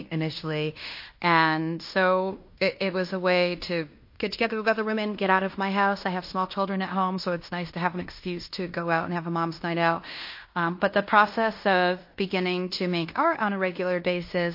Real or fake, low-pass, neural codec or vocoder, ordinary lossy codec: fake; 5.4 kHz; codec, 16 kHz in and 24 kHz out, 0.9 kbps, LongCat-Audio-Codec, fine tuned four codebook decoder; MP3, 32 kbps